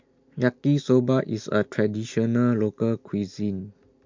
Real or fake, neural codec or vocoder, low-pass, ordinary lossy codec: real; none; 7.2 kHz; MP3, 64 kbps